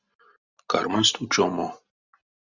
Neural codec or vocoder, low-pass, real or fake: none; 7.2 kHz; real